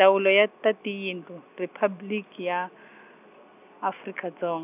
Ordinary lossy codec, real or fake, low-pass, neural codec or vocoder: none; real; 3.6 kHz; none